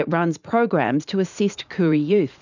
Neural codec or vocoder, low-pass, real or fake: vocoder, 44.1 kHz, 80 mel bands, Vocos; 7.2 kHz; fake